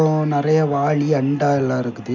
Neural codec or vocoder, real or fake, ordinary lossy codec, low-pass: none; real; none; 7.2 kHz